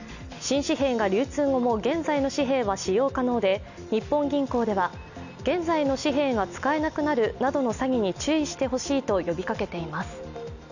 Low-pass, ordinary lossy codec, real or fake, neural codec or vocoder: 7.2 kHz; none; real; none